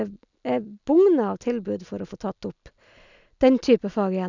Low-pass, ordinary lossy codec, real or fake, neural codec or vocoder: 7.2 kHz; none; real; none